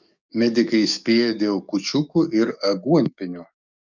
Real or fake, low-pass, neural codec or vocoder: fake; 7.2 kHz; codec, 44.1 kHz, 7.8 kbps, DAC